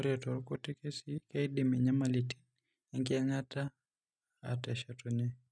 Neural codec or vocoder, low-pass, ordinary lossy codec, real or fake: none; none; none; real